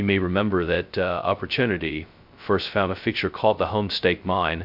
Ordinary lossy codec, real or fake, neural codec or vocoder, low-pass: MP3, 48 kbps; fake; codec, 16 kHz, 0.2 kbps, FocalCodec; 5.4 kHz